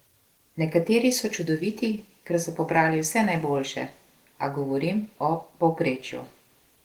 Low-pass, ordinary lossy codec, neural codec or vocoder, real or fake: 19.8 kHz; Opus, 16 kbps; none; real